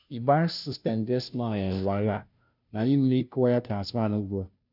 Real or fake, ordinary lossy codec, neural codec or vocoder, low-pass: fake; none; codec, 16 kHz, 0.5 kbps, FunCodec, trained on Chinese and English, 25 frames a second; 5.4 kHz